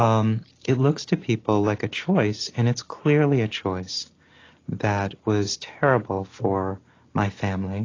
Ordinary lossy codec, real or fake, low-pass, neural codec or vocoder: AAC, 32 kbps; real; 7.2 kHz; none